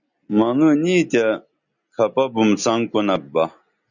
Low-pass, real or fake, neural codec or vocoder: 7.2 kHz; real; none